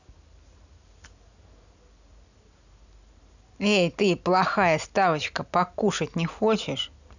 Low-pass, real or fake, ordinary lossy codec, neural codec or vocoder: 7.2 kHz; real; none; none